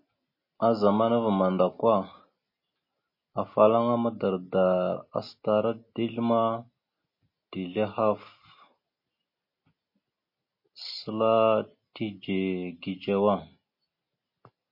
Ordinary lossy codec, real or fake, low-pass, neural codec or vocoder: MP3, 24 kbps; real; 5.4 kHz; none